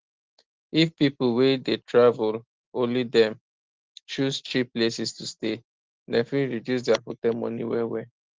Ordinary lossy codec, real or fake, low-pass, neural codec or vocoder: Opus, 16 kbps; real; 7.2 kHz; none